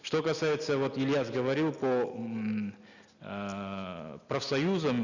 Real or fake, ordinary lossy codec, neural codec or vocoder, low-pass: real; none; none; 7.2 kHz